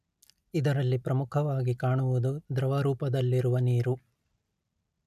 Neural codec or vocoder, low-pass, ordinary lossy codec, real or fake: none; 14.4 kHz; none; real